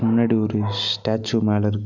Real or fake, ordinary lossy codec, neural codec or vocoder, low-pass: real; none; none; 7.2 kHz